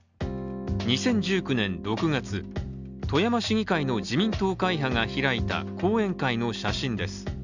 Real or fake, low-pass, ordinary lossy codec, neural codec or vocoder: real; 7.2 kHz; none; none